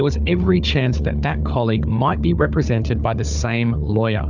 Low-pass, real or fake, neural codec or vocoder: 7.2 kHz; fake; codec, 16 kHz, 4 kbps, FunCodec, trained on Chinese and English, 50 frames a second